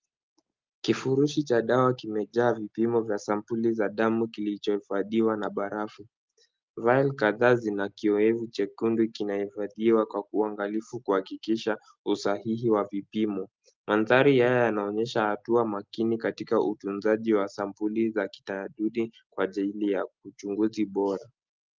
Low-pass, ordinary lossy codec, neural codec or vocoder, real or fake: 7.2 kHz; Opus, 24 kbps; none; real